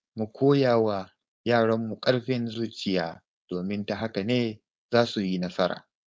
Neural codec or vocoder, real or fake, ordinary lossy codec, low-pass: codec, 16 kHz, 4.8 kbps, FACodec; fake; none; none